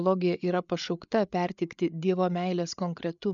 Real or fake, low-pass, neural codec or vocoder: fake; 7.2 kHz; codec, 16 kHz, 8 kbps, FreqCodec, larger model